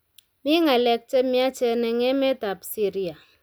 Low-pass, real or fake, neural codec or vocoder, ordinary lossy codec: none; real; none; none